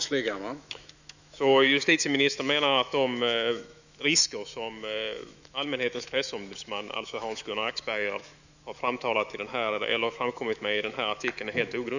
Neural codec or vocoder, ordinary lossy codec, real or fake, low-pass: autoencoder, 48 kHz, 128 numbers a frame, DAC-VAE, trained on Japanese speech; none; fake; 7.2 kHz